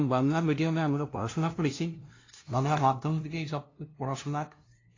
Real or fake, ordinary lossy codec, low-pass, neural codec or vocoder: fake; AAC, 32 kbps; 7.2 kHz; codec, 16 kHz, 0.5 kbps, FunCodec, trained on LibriTTS, 25 frames a second